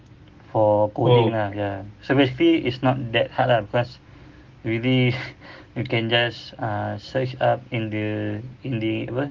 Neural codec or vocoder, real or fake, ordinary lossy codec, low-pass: none; real; Opus, 16 kbps; 7.2 kHz